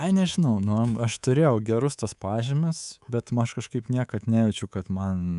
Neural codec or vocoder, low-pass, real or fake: codec, 24 kHz, 3.1 kbps, DualCodec; 10.8 kHz; fake